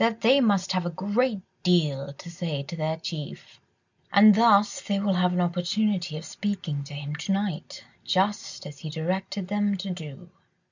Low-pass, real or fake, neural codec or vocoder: 7.2 kHz; real; none